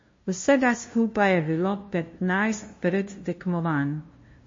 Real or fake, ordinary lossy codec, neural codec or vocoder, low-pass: fake; MP3, 32 kbps; codec, 16 kHz, 0.5 kbps, FunCodec, trained on LibriTTS, 25 frames a second; 7.2 kHz